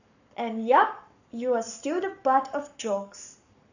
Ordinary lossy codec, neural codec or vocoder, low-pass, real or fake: none; codec, 44.1 kHz, 7.8 kbps, Pupu-Codec; 7.2 kHz; fake